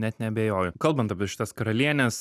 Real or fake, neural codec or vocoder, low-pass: fake; vocoder, 44.1 kHz, 128 mel bands every 256 samples, BigVGAN v2; 14.4 kHz